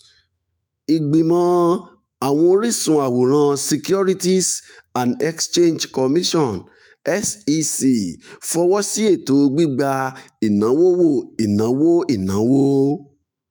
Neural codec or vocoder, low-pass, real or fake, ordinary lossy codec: autoencoder, 48 kHz, 128 numbers a frame, DAC-VAE, trained on Japanese speech; none; fake; none